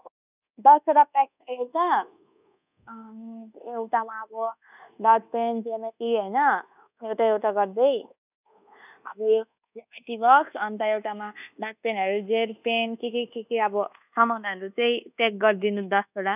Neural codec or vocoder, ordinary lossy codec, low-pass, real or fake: codec, 24 kHz, 1.2 kbps, DualCodec; none; 3.6 kHz; fake